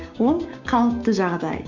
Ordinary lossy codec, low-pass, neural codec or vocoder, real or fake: Opus, 64 kbps; 7.2 kHz; codec, 16 kHz, 6 kbps, DAC; fake